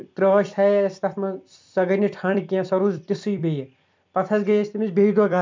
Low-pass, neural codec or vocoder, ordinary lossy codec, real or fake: 7.2 kHz; vocoder, 44.1 kHz, 128 mel bands every 256 samples, BigVGAN v2; MP3, 64 kbps; fake